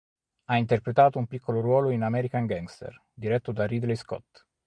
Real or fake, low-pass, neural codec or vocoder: real; 9.9 kHz; none